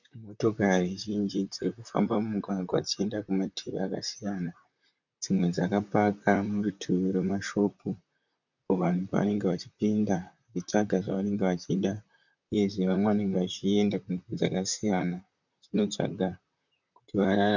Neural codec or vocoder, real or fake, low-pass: vocoder, 44.1 kHz, 128 mel bands, Pupu-Vocoder; fake; 7.2 kHz